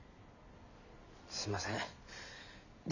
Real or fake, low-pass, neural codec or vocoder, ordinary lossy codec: real; 7.2 kHz; none; none